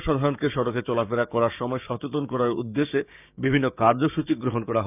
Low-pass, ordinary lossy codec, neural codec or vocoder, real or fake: 3.6 kHz; none; codec, 16 kHz, 6 kbps, DAC; fake